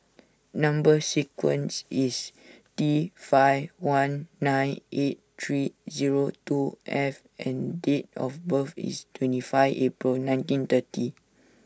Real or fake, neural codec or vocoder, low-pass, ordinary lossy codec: real; none; none; none